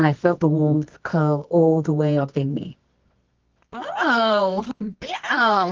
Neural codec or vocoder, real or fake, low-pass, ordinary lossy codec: codec, 24 kHz, 0.9 kbps, WavTokenizer, medium music audio release; fake; 7.2 kHz; Opus, 24 kbps